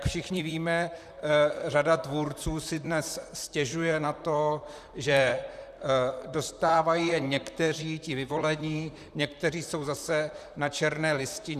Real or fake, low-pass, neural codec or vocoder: fake; 14.4 kHz; vocoder, 44.1 kHz, 128 mel bands, Pupu-Vocoder